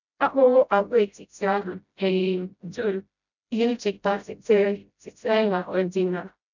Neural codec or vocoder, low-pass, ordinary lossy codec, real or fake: codec, 16 kHz, 0.5 kbps, FreqCodec, smaller model; 7.2 kHz; none; fake